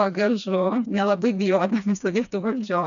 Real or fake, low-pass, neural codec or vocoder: fake; 7.2 kHz; codec, 16 kHz, 2 kbps, FreqCodec, smaller model